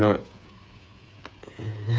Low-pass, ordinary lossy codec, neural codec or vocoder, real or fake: none; none; codec, 16 kHz, 8 kbps, FreqCodec, smaller model; fake